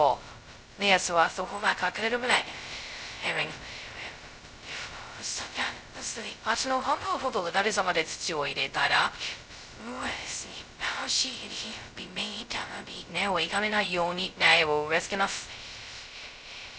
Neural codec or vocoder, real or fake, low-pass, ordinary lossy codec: codec, 16 kHz, 0.2 kbps, FocalCodec; fake; none; none